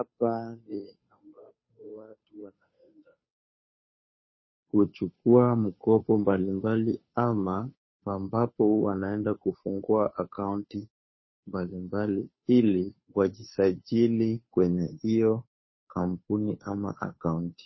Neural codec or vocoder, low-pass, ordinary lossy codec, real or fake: codec, 16 kHz, 2 kbps, FunCodec, trained on Chinese and English, 25 frames a second; 7.2 kHz; MP3, 24 kbps; fake